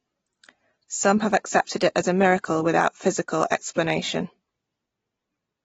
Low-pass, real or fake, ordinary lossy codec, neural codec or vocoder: 14.4 kHz; real; AAC, 24 kbps; none